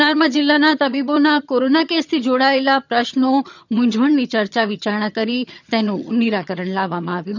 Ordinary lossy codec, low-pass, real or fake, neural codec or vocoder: none; 7.2 kHz; fake; vocoder, 22.05 kHz, 80 mel bands, HiFi-GAN